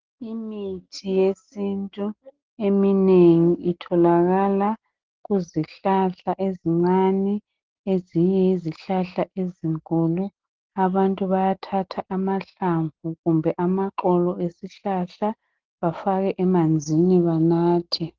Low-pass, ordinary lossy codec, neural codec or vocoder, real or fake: 7.2 kHz; Opus, 16 kbps; none; real